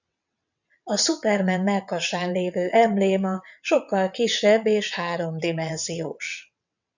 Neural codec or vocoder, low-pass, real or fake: vocoder, 22.05 kHz, 80 mel bands, WaveNeXt; 7.2 kHz; fake